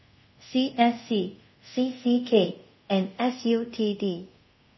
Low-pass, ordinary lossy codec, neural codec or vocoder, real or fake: 7.2 kHz; MP3, 24 kbps; codec, 24 kHz, 0.5 kbps, DualCodec; fake